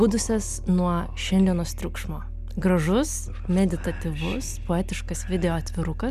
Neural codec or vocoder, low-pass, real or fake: none; 14.4 kHz; real